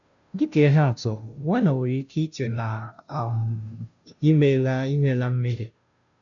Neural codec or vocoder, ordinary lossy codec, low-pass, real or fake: codec, 16 kHz, 0.5 kbps, FunCodec, trained on Chinese and English, 25 frames a second; none; 7.2 kHz; fake